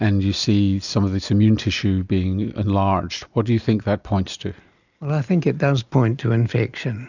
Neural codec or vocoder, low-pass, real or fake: none; 7.2 kHz; real